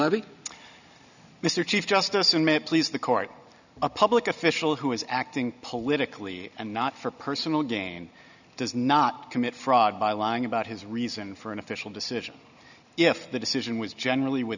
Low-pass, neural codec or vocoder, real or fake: 7.2 kHz; none; real